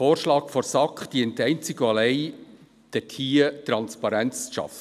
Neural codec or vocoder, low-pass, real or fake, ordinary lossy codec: none; 14.4 kHz; real; none